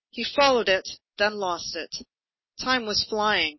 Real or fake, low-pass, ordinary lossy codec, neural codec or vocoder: real; 7.2 kHz; MP3, 24 kbps; none